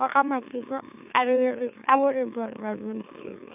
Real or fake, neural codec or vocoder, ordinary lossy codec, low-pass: fake; autoencoder, 44.1 kHz, a latent of 192 numbers a frame, MeloTTS; none; 3.6 kHz